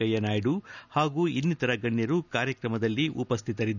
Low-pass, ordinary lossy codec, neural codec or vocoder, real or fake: 7.2 kHz; none; none; real